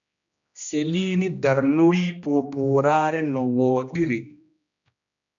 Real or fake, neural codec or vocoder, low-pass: fake; codec, 16 kHz, 1 kbps, X-Codec, HuBERT features, trained on general audio; 7.2 kHz